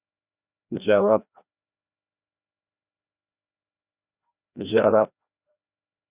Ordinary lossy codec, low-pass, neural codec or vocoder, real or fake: Opus, 64 kbps; 3.6 kHz; codec, 16 kHz, 1 kbps, FreqCodec, larger model; fake